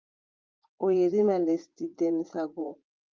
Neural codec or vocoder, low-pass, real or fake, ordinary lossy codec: vocoder, 44.1 kHz, 80 mel bands, Vocos; 7.2 kHz; fake; Opus, 24 kbps